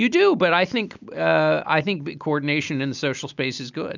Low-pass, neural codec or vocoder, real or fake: 7.2 kHz; none; real